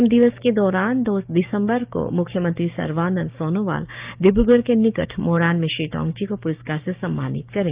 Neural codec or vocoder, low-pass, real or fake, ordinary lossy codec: codec, 16 kHz, 6 kbps, DAC; 3.6 kHz; fake; Opus, 24 kbps